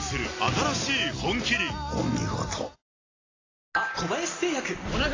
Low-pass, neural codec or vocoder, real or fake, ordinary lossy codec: 7.2 kHz; none; real; AAC, 32 kbps